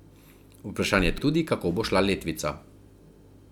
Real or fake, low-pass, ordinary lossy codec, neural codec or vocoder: real; 19.8 kHz; none; none